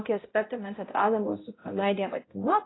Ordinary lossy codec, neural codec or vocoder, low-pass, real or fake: AAC, 16 kbps; codec, 16 kHz, 0.5 kbps, X-Codec, HuBERT features, trained on balanced general audio; 7.2 kHz; fake